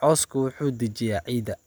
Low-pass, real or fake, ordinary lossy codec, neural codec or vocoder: none; real; none; none